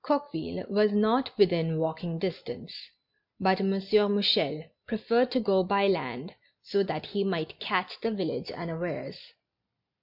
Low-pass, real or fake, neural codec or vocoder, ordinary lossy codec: 5.4 kHz; real; none; MP3, 48 kbps